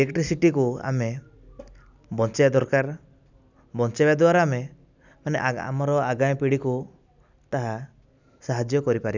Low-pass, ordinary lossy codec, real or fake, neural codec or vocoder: 7.2 kHz; none; real; none